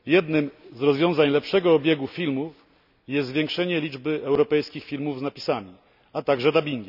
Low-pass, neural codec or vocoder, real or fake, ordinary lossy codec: 5.4 kHz; none; real; none